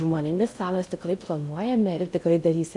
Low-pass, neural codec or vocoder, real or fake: 10.8 kHz; codec, 16 kHz in and 24 kHz out, 0.6 kbps, FocalCodec, streaming, 4096 codes; fake